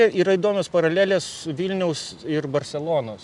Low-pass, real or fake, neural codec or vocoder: 10.8 kHz; real; none